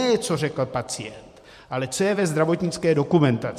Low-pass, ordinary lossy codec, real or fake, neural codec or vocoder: 14.4 kHz; MP3, 64 kbps; fake; vocoder, 44.1 kHz, 128 mel bands every 512 samples, BigVGAN v2